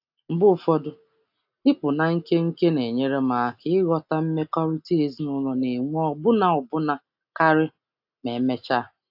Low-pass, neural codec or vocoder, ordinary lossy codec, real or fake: 5.4 kHz; none; AAC, 48 kbps; real